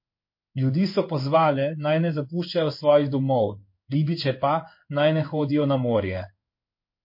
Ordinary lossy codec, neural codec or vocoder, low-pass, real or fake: MP3, 32 kbps; codec, 16 kHz in and 24 kHz out, 1 kbps, XY-Tokenizer; 5.4 kHz; fake